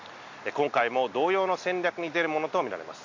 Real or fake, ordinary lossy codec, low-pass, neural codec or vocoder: real; AAC, 48 kbps; 7.2 kHz; none